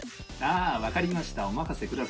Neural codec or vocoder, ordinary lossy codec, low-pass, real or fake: none; none; none; real